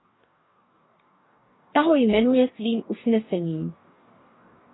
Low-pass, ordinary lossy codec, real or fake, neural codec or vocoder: 7.2 kHz; AAC, 16 kbps; fake; codec, 44.1 kHz, 2.6 kbps, DAC